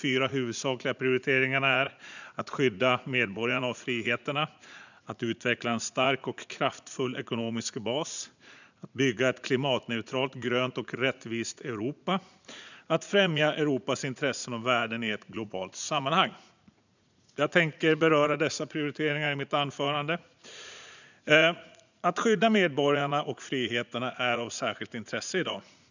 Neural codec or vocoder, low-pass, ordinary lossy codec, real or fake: vocoder, 44.1 kHz, 80 mel bands, Vocos; 7.2 kHz; none; fake